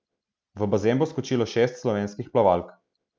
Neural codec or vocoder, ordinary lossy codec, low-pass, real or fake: none; none; none; real